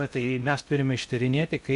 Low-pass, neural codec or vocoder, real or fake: 10.8 kHz; codec, 16 kHz in and 24 kHz out, 0.6 kbps, FocalCodec, streaming, 4096 codes; fake